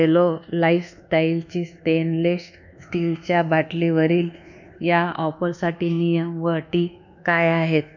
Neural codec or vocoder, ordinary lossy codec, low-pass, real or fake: codec, 24 kHz, 1.2 kbps, DualCodec; none; 7.2 kHz; fake